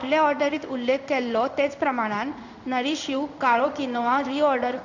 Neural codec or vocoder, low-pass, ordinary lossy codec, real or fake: codec, 16 kHz in and 24 kHz out, 1 kbps, XY-Tokenizer; 7.2 kHz; none; fake